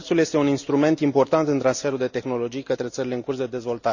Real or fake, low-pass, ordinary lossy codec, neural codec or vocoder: real; 7.2 kHz; none; none